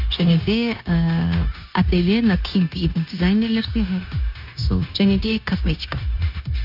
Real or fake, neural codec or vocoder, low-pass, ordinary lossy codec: fake; codec, 16 kHz, 0.9 kbps, LongCat-Audio-Codec; 5.4 kHz; none